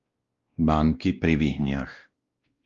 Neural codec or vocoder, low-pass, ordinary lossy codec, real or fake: codec, 16 kHz, 1 kbps, X-Codec, WavLM features, trained on Multilingual LibriSpeech; 7.2 kHz; Opus, 32 kbps; fake